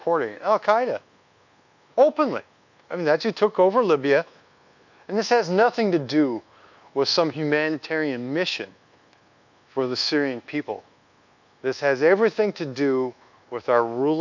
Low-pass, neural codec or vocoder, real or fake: 7.2 kHz; codec, 24 kHz, 1.2 kbps, DualCodec; fake